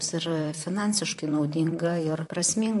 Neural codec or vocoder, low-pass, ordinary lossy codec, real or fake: vocoder, 44.1 kHz, 128 mel bands, Pupu-Vocoder; 14.4 kHz; MP3, 48 kbps; fake